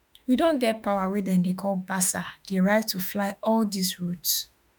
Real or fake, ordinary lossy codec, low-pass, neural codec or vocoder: fake; none; none; autoencoder, 48 kHz, 32 numbers a frame, DAC-VAE, trained on Japanese speech